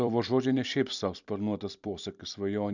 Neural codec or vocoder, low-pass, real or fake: vocoder, 44.1 kHz, 128 mel bands every 256 samples, BigVGAN v2; 7.2 kHz; fake